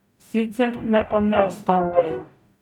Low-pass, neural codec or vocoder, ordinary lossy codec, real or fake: 19.8 kHz; codec, 44.1 kHz, 0.9 kbps, DAC; none; fake